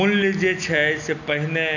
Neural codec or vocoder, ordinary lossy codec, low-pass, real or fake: none; none; 7.2 kHz; real